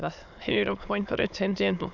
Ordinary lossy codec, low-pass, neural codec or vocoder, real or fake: none; 7.2 kHz; autoencoder, 22.05 kHz, a latent of 192 numbers a frame, VITS, trained on many speakers; fake